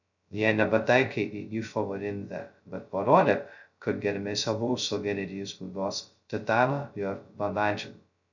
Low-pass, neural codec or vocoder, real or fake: 7.2 kHz; codec, 16 kHz, 0.2 kbps, FocalCodec; fake